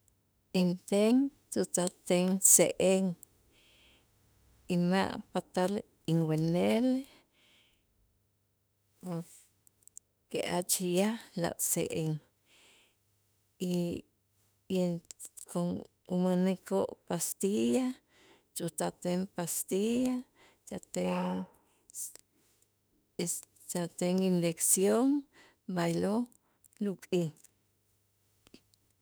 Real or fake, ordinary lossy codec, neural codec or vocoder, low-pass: fake; none; autoencoder, 48 kHz, 32 numbers a frame, DAC-VAE, trained on Japanese speech; none